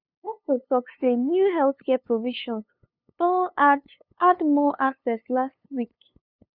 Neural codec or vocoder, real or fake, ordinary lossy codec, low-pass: codec, 16 kHz, 2 kbps, FunCodec, trained on LibriTTS, 25 frames a second; fake; none; 5.4 kHz